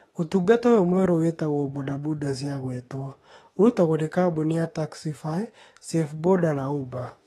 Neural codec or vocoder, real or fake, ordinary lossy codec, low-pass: autoencoder, 48 kHz, 32 numbers a frame, DAC-VAE, trained on Japanese speech; fake; AAC, 32 kbps; 19.8 kHz